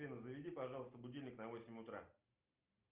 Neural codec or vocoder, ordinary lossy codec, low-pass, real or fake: none; Opus, 24 kbps; 3.6 kHz; real